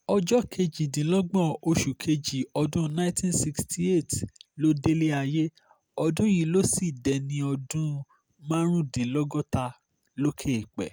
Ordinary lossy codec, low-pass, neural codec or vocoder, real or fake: none; none; none; real